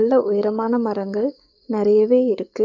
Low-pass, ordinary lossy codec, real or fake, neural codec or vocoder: 7.2 kHz; none; fake; codec, 44.1 kHz, 7.8 kbps, DAC